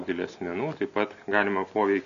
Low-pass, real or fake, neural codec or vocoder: 7.2 kHz; real; none